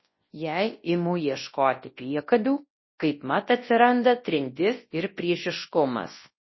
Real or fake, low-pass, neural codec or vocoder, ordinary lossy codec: fake; 7.2 kHz; codec, 24 kHz, 0.9 kbps, WavTokenizer, large speech release; MP3, 24 kbps